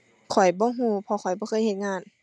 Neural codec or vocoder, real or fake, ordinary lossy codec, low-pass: none; real; none; none